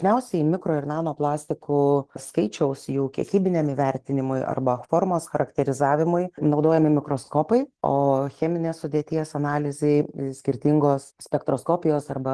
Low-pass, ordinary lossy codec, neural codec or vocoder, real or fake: 10.8 kHz; Opus, 16 kbps; none; real